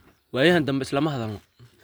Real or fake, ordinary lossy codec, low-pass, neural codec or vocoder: real; none; none; none